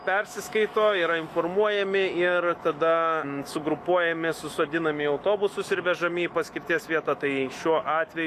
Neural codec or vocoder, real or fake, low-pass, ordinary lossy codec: autoencoder, 48 kHz, 128 numbers a frame, DAC-VAE, trained on Japanese speech; fake; 14.4 kHz; Opus, 64 kbps